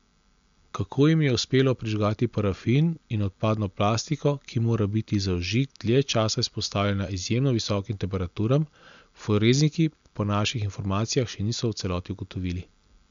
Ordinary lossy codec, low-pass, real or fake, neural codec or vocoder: MP3, 64 kbps; 7.2 kHz; real; none